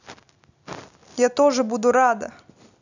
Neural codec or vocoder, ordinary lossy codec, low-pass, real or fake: none; none; 7.2 kHz; real